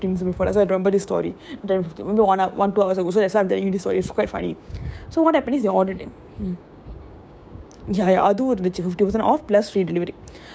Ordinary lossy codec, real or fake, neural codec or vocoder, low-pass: none; fake; codec, 16 kHz, 6 kbps, DAC; none